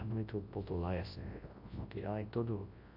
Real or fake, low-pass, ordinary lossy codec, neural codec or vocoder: fake; 5.4 kHz; MP3, 32 kbps; codec, 24 kHz, 0.9 kbps, WavTokenizer, large speech release